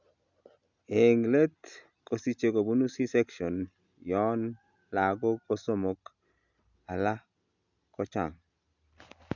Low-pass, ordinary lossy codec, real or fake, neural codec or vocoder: 7.2 kHz; none; real; none